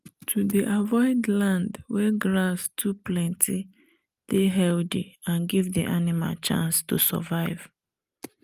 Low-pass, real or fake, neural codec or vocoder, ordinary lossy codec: 14.4 kHz; real; none; Opus, 32 kbps